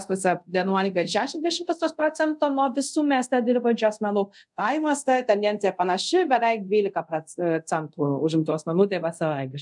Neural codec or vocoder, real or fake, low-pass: codec, 24 kHz, 0.5 kbps, DualCodec; fake; 10.8 kHz